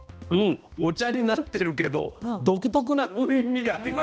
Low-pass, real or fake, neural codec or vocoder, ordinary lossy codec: none; fake; codec, 16 kHz, 1 kbps, X-Codec, HuBERT features, trained on balanced general audio; none